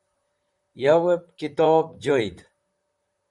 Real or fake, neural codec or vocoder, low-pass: fake; vocoder, 44.1 kHz, 128 mel bands, Pupu-Vocoder; 10.8 kHz